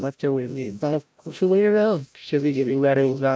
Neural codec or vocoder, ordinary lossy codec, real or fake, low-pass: codec, 16 kHz, 0.5 kbps, FreqCodec, larger model; none; fake; none